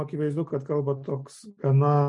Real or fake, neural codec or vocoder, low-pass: real; none; 10.8 kHz